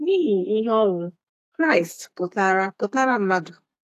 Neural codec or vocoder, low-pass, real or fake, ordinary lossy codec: codec, 32 kHz, 1.9 kbps, SNAC; 14.4 kHz; fake; AAC, 64 kbps